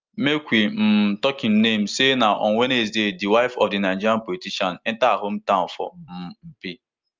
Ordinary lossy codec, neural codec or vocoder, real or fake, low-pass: Opus, 32 kbps; none; real; 7.2 kHz